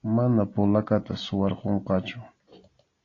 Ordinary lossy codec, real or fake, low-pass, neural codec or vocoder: AAC, 32 kbps; real; 7.2 kHz; none